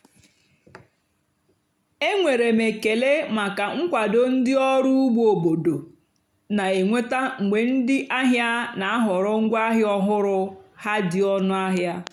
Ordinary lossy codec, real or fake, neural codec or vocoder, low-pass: none; real; none; 19.8 kHz